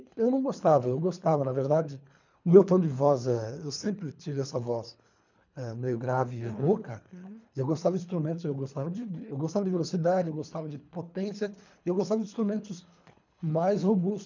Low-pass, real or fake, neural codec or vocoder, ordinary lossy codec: 7.2 kHz; fake; codec, 24 kHz, 3 kbps, HILCodec; none